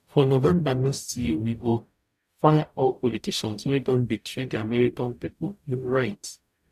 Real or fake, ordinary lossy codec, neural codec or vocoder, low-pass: fake; none; codec, 44.1 kHz, 0.9 kbps, DAC; 14.4 kHz